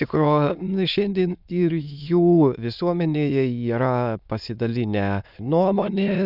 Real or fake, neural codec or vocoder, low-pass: fake; autoencoder, 22.05 kHz, a latent of 192 numbers a frame, VITS, trained on many speakers; 5.4 kHz